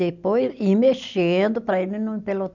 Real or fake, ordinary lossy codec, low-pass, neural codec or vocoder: real; none; 7.2 kHz; none